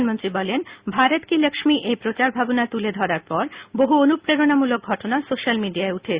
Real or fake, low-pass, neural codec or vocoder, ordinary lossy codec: real; 3.6 kHz; none; Opus, 64 kbps